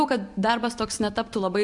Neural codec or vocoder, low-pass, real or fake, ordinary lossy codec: none; 10.8 kHz; real; MP3, 64 kbps